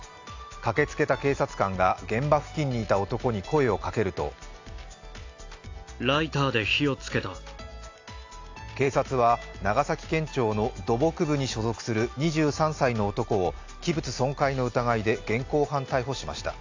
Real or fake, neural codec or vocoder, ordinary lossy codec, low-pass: real; none; AAC, 48 kbps; 7.2 kHz